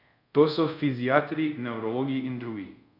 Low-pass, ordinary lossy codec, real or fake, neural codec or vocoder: 5.4 kHz; none; fake; codec, 24 kHz, 0.5 kbps, DualCodec